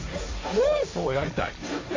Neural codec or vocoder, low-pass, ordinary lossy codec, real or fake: codec, 16 kHz, 1.1 kbps, Voila-Tokenizer; 7.2 kHz; MP3, 32 kbps; fake